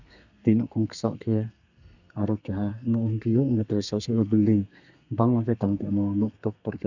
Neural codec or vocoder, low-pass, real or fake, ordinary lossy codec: codec, 32 kHz, 1.9 kbps, SNAC; 7.2 kHz; fake; none